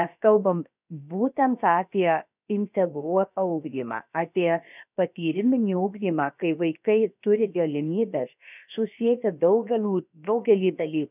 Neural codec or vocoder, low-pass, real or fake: codec, 16 kHz, about 1 kbps, DyCAST, with the encoder's durations; 3.6 kHz; fake